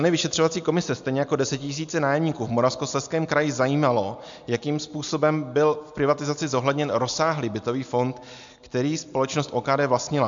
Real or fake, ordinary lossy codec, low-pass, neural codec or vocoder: real; MP3, 64 kbps; 7.2 kHz; none